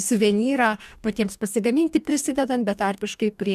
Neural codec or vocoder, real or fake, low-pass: codec, 44.1 kHz, 2.6 kbps, DAC; fake; 14.4 kHz